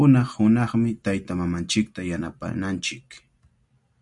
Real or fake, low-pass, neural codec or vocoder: real; 10.8 kHz; none